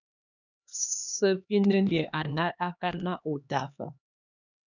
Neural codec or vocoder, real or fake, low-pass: codec, 16 kHz, 2 kbps, X-Codec, HuBERT features, trained on LibriSpeech; fake; 7.2 kHz